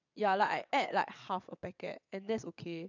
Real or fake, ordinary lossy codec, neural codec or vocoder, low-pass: fake; none; vocoder, 22.05 kHz, 80 mel bands, WaveNeXt; 7.2 kHz